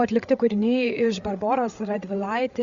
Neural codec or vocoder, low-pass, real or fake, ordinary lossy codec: codec, 16 kHz, 8 kbps, FreqCodec, larger model; 7.2 kHz; fake; Opus, 64 kbps